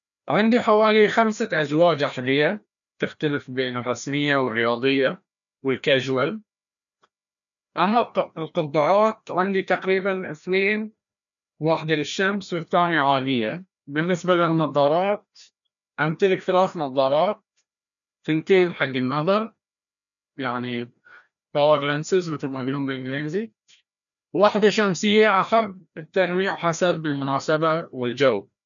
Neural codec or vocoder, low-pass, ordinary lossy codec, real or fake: codec, 16 kHz, 1 kbps, FreqCodec, larger model; 7.2 kHz; none; fake